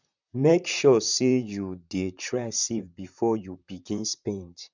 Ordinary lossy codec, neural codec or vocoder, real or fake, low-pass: none; vocoder, 44.1 kHz, 128 mel bands, Pupu-Vocoder; fake; 7.2 kHz